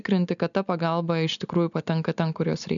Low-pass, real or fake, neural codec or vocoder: 7.2 kHz; real; none